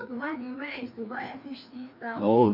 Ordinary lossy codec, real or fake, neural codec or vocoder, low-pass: none; fake; codec, 16 kHz, 2 kbps, FreqCodec, larger model; 5.4 kHz